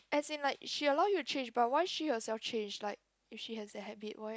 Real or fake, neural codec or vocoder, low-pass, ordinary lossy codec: real; none; none; none